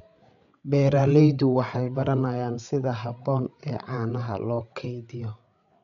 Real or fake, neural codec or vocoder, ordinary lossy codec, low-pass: fake; codec, 16 kHz, 8 kbps, FreqCodec, larger model; none; 7.2 kHz